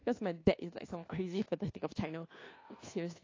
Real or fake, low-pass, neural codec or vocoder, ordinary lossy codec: fake; 7.2 kHz; codec, 24 kHz, 1.2 kbps, DualCodec; AAC, 32 kbps